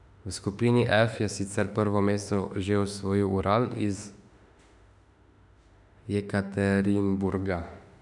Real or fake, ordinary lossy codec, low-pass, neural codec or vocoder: fake; none; 10.8 kHz; autoencoder, 48 kHz, 32 numbers a frame, DAC-VAE, trained on Japanese speech